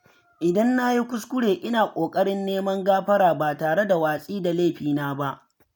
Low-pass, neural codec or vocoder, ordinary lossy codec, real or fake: none; none; none; real